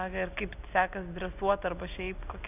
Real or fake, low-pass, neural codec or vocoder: real; 3.6 kHz; none